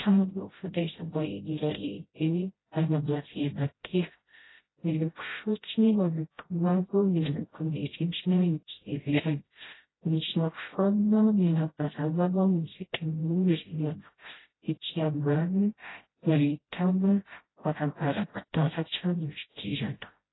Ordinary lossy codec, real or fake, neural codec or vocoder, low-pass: AAC, 16 kbps; fake; codec, 16 kHz, 0.5 kbps, FreqCodec, smaller model; 7.2 kHz